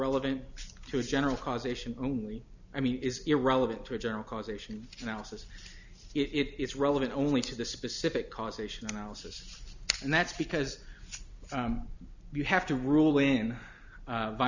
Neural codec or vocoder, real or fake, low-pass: none; real; 7.2 kHz